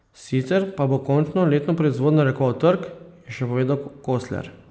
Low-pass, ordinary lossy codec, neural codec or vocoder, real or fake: none; none; none; real